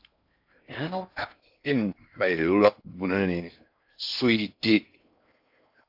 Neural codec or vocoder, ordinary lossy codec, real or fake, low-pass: codec, 16 kHz in and 24 kHz out, 0.6 kbps, FocalCodec, streaming, 4096 codes; AAC, 48 kbps; fake; 5.4 kHz